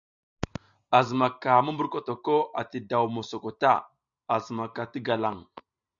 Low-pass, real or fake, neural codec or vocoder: 7.2 kHz; real; none